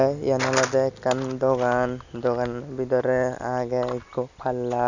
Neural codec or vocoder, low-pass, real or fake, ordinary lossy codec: none; 7.2 kHz; real; none